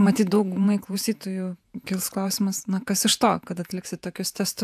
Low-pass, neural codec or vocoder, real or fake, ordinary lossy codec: 14.4 kHz; vocoder, 48 kHz, 128 mel bands, Vocos; fake; AAC, 96 kbps